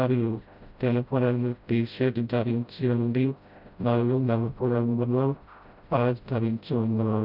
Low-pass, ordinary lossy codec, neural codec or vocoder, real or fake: 5.4 kHz; none; codec, 16 kHz, 0.5 kbps, FreqCodec, smaller model; fake